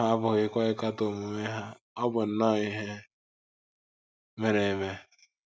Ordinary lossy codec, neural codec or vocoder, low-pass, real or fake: none; none; none; real